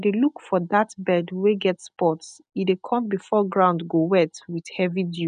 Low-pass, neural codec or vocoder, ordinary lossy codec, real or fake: 9.9 kHz; none; none; real